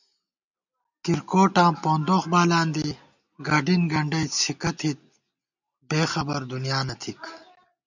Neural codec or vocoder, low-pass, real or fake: none; 7.2 kHz; real